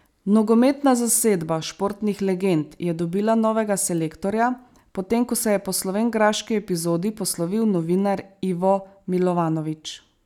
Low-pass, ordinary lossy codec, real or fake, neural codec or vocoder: 19.8 kHz; none; real; none